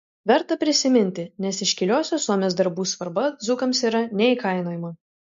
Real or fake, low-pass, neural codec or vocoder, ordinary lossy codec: real; 7.2 kHz; none; MP3, 48 kbps